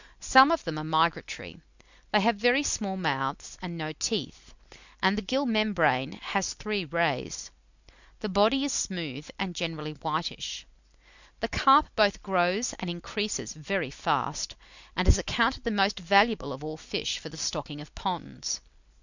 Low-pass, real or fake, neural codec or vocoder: 7.2 kHz; real; none